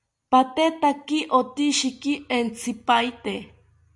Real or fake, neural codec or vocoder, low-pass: real; none; 10.8 kHz